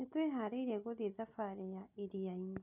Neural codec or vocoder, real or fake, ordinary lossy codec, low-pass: none; real; none; 3.6 kHz